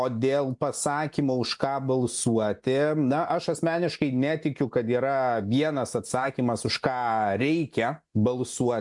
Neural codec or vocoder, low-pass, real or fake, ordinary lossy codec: none; 10.8 kHz; real; MP3, 64 kbps